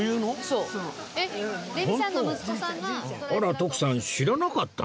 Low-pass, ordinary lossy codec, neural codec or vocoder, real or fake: none; none; none; real